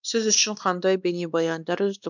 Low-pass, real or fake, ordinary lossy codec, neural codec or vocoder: 7.2 kHz; fake; none; codec, 16 kHz, 2 kbps, X-Codec, WavLM features, trained on Multilingual LibriSpeech